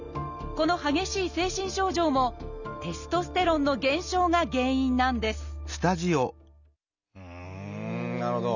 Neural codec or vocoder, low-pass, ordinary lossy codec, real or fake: none; 7.2 kHz; none; real